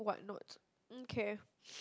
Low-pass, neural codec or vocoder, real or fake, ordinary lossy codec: none; none; real; none